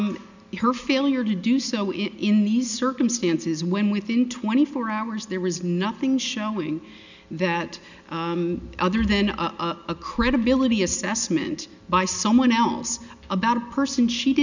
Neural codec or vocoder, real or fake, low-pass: none; real; 7.2 kHz